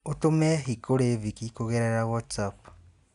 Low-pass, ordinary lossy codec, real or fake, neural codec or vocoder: 10.8 kHz; none; real; none